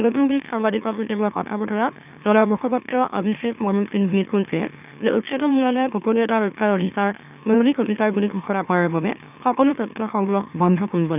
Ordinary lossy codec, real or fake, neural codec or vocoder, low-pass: none; fake; autoencoder, 44.1 kHz, a latent of 192 numbers a frame, MeloTTS; 3.6 kHz